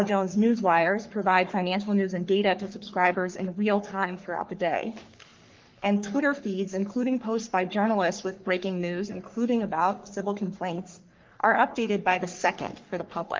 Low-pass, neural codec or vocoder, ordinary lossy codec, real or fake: 7.2 kHz; codec, 44.1 kHz, 3.4 kbps, Pupu-Codec; Opus, 24 kbps; fake